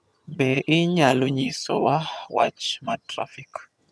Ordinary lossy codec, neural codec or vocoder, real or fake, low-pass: none; vocoder, 22.05 kHz, 80 mel bands, HiFi-GAN; fake; none